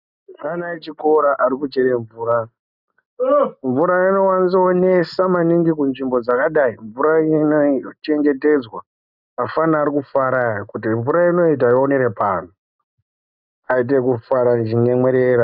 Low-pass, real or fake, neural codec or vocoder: 5.4 kHz; real; none